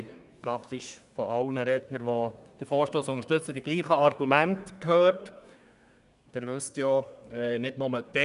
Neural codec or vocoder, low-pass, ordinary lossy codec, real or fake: codec, 24 kHz, 1 kbps, SNAC; 10.8 kHz; none; fake